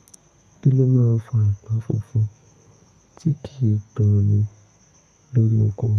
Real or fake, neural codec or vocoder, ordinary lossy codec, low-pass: fake; codec, 32 kHz, 1.9 kbps, SNAC; none; 14.4 kHz